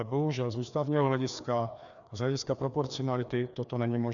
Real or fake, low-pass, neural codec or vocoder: fake; 7.2 kHz; codec, 16 kHz, 2 kbps, FreqCodec, larger model